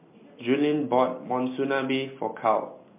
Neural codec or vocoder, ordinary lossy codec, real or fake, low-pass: none; MP3, 24 kbps; real; 3.6 kHz